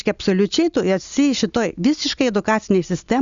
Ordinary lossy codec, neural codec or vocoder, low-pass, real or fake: Opus, 64 kbps; none; 7.2 kHz; real